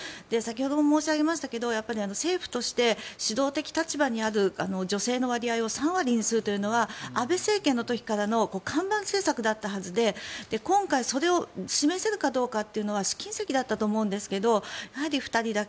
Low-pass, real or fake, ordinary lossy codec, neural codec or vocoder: none; real; none; none